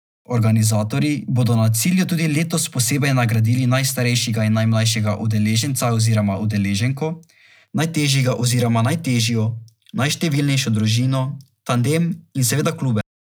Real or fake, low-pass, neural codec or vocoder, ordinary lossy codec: real; none; none; none